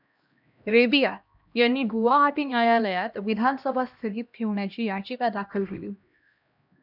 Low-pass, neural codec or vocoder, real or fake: 5.4 kHz; codec, 16 kHz, 1 kbps, X-Codec, HuBERT features, trained on LibriSpeech; fake